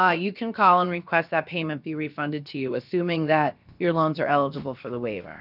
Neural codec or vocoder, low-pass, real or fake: codec, 16 kHz, about 1 kbps, DyCAST, with the encoder's durations; 5.4 kHz; fake